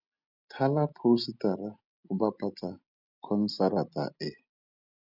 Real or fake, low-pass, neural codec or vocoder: real; 5.4 kHz; none